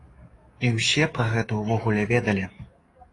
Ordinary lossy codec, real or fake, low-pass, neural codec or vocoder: AAC, 32 kbps; fake; 10.8 kHz; codec, 44.1 kHz, 7.8 kbps, DAC